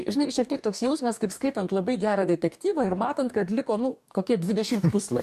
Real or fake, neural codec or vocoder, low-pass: fake; codec, 44.1 kHz, 2.6 kbps, DAC; 14.4 kHz